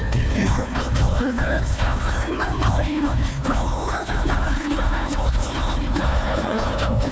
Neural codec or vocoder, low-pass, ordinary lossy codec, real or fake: codec, 16 kHz, 1 kbps, FunCodec, trained on Chinese and English, 50 frames a second; none; none; fake